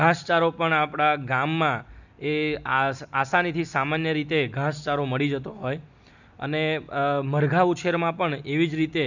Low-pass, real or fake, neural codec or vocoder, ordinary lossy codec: 7.2 kHz; real; none; none